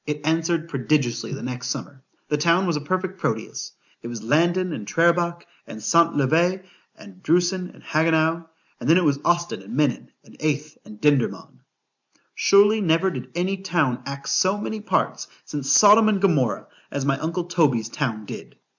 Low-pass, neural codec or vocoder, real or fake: 7.2 kHz; none; real